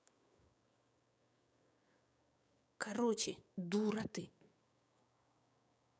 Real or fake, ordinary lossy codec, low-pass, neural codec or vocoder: fake; none; none; codec, 16 kHz, 6 kbps, DAC